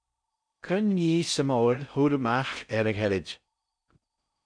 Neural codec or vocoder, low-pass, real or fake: codec, 16 kHz in and 24 kHz out, 0.6 kbps, FocalCodec, streaming, 4096 codes; 9.9 kHz; fake